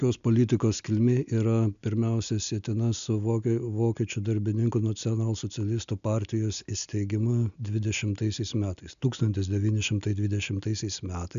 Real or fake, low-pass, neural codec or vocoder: real; 7.2 kHz; none